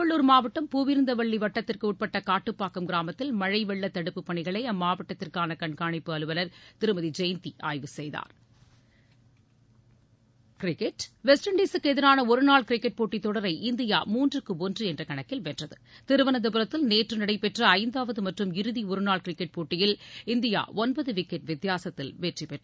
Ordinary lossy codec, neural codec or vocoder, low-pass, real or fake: none; none; none; real